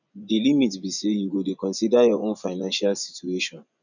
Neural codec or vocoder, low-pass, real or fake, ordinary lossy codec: vocoder, 24 kHz, 100 mel bands, Vocos; 7.2 kHz; fake; none